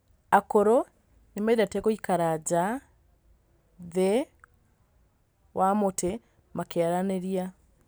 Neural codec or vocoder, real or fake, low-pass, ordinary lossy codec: none; real; none; none